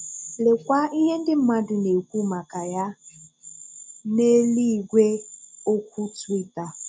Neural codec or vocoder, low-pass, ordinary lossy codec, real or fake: none; none; none; real